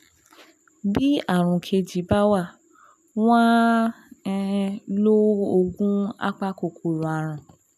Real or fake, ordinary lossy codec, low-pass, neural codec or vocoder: real; none; 14.4 kHz; none